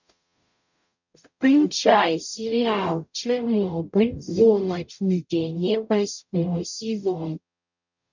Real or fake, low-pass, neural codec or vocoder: fake; 7.2 kHz; codec, 44.1 kHz, 0.9 kbps, DAC